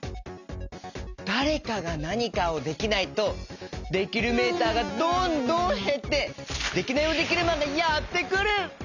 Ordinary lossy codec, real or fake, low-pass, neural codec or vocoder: none; real; 7.2 kHz; none